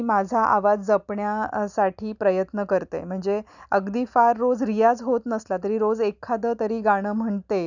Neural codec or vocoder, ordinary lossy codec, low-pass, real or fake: none; none; 7.2 kHz; real